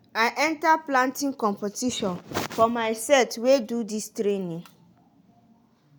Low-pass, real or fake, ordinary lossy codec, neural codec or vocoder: none; real; none; none